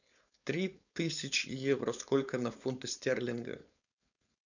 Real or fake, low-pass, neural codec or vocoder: fake; 7.2 kHz; codec, 16 kHz, 4.8 kbps, FACodec